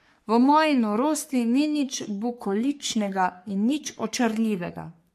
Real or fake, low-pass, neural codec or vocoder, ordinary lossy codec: fake; 14.4 kHz; codec, 44.1 kHz, 3.4 kbps, Pupu-Codec; MP3, 64 kbps